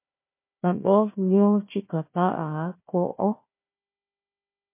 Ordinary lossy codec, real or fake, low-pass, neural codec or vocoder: MP3, 24 kbps; fake; 3.6 kHz; codec, 16 kHz, 1 kbps, FunCodec, trained on Chinese and English, 50 frames a second